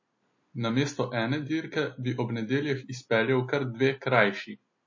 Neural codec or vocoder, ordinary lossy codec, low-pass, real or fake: none; MP3, 32 kbps; 7.2 kHz; real